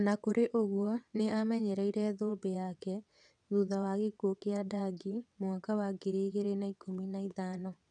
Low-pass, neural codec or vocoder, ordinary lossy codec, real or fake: 9.9 kHz; vocoder, 22.05 kHz, 80 mel bands, WaveNeXt; none; fake